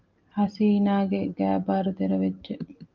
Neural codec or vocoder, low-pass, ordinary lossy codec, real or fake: none; 7.2 kHz; Opus, 24 kbps; real